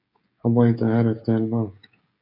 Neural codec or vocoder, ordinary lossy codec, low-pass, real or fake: codec, 16 kHz, 16 kbps, FreqCodec, smaller model; MP3, 48 kbps; 5.4 kHz; fake